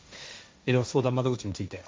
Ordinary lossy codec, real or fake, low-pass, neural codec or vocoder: none; fake; none; codec, 16 kHz, 1.1 kbps, Voila-Tokenizer